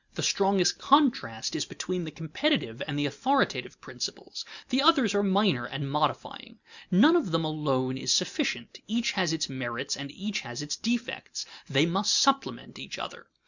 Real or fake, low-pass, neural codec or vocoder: real; 7.2 kHz; none